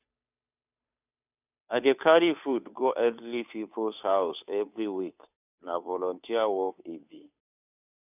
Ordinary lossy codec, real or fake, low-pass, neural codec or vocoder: none; fake; 3.6 kHz; codec, 16 kHz, 2 kbps, FunCodec, trained on Chinese and English, 25 frames a second